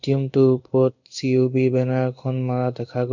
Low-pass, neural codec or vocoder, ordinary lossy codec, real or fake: 7.2 kHz; codec, 16 kHz in and 24 kHz out, 1 kbps, XY-Tokenizer; none; fake